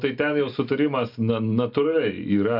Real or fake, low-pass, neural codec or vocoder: real; 5.4 kHz; none